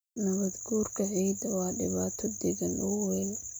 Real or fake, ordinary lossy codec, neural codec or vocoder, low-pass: real; none; none; none